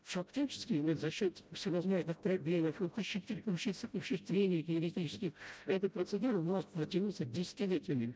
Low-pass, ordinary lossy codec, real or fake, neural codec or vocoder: none; none; fake; codec, 16 kHz, 0.5 kbps, FreqCodec, smaller model